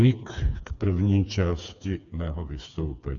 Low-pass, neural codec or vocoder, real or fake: 7.2 kHz; codec, 16 kHz, 4 kbps, FreqCodec, smaller model; fake